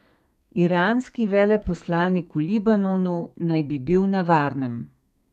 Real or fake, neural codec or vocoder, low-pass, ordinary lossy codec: fake; codec, 32 kHz, 1.9 kbps, SNAC; 14.4 kHz; none